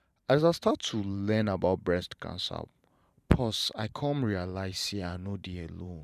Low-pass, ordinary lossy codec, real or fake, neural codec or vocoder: 14.4 kHz; none; fake; vocoder, 44.1 kHz, 128 mel bands every 512 samples, BigVGAN v2